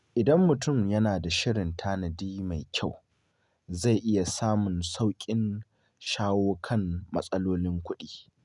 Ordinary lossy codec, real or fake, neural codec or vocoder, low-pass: none; real; none; 10.8 kHz